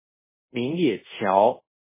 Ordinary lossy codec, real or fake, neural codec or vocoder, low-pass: MP3, 16 kbps; fake; codec, 24 kHz, 0.5 kbps, DualCodec; 3.6 kHz